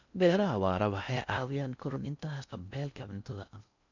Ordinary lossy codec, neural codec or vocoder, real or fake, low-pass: none; codec, 16 kHz in and 24 kHz out, 0.6 kbps, FocalCodec, streaming, 2048 codes; fake; 7.2 kHz